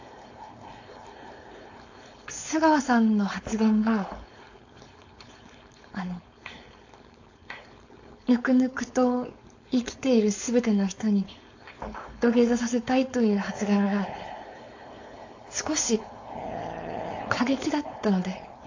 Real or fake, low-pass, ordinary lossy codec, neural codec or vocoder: fake; 7.2 kHz; AAC, 48 kbps; codec, 16 kHz, 4.8 kbps, FACodec